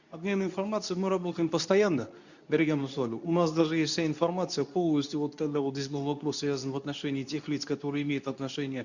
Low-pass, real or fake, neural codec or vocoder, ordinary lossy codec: 7.2 kHz; fake; codec, 24 kHz, 0.9 kbps, WavTokenizer, medium speech release version 2; none